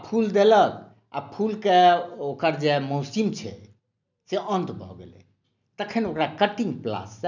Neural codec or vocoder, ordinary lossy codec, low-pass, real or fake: none; none; 7.2 kHz; real